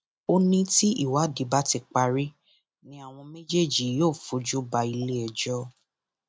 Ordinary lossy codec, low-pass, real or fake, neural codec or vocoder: none; none; real; none